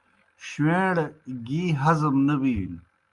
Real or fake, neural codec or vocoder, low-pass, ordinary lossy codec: real; none; 10.8 kHz; Opus, 32 kbps